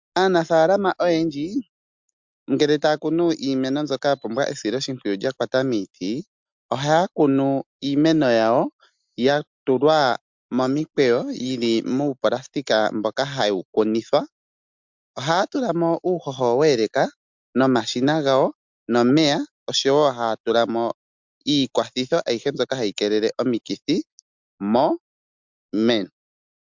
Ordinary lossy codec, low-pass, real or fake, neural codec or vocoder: MP3, 64 kbps; 7.2 kHz; real; none